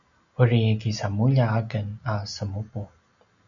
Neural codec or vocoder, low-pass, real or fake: none; 7.2 kHz; real